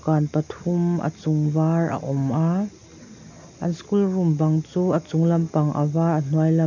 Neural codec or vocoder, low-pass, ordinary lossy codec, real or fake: none; 7.2 kHz; none; real